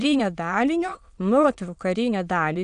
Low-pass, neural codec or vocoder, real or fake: 9.9 kHz; autoencoder, 22.05 kHz, a latent of 192 numbers a frame, VITS, trained on many speakers; fake